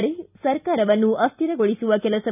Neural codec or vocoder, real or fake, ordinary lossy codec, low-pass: none; real; none; 3.6 kHz